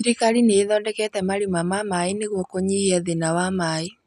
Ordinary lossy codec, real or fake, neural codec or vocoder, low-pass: none; real; none; 14.4 kHz